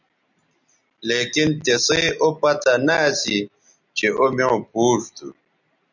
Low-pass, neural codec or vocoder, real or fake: 7.2 kHz; none; real